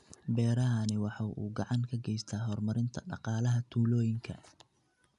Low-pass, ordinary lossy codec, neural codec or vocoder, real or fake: 10.8 kHz; none; none; real